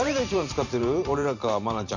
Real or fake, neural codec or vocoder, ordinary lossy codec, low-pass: fake; vocoder, 44.1 kHz, 128 mel bands every 512 samples, BigVGAN v2; none; 7.2 kHz